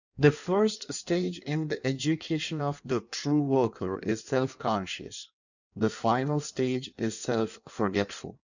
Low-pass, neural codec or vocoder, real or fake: 7.2 kHz; codec, 16 kHz in and 24 kHz out, 1.1 kbps, FireRedTTS-2 codec; fake